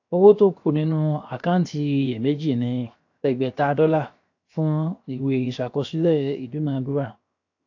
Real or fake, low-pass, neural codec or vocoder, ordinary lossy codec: fake; 7.2 kHz; codec, 16 kHz, 0.7 kbps, FocalCodec; AAC, 48 kbps